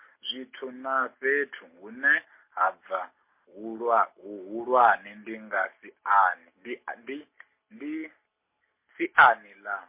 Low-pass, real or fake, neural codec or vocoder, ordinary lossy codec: 3.6 kHz; real; none; MP3, 24 kbps